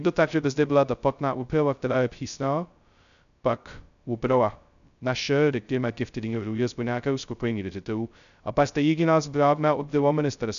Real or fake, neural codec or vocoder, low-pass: fake; codec, 16 kHz, 0.2 kbps, FocalCodec; 7.2 kHz